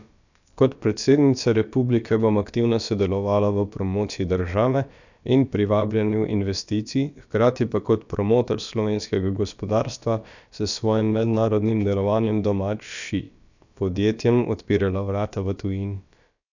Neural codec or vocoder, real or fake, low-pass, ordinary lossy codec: codec, 16 kHz, about 1 kbps, DyCAST, with the encoder's durations; fake; 7.2 kHz; none